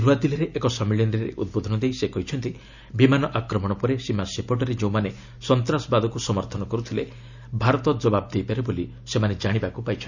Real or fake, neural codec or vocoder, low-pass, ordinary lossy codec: real; none; 7.2 kHz; none